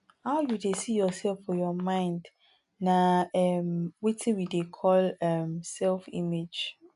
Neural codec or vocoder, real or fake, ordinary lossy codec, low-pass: none; real; none; 10.8 kHz